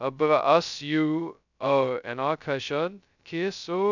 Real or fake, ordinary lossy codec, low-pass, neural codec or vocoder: fake; none; 7.2 kHz; codec, 16 kHz, 0.2 kbps, FocalCodec